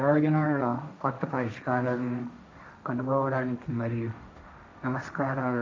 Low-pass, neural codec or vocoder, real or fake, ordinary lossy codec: none; codec, 16 kHz, 1.1 kbps, Voila-Tokenizer; fake; none